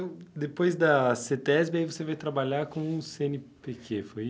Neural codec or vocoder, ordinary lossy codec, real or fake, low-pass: none; none; real; none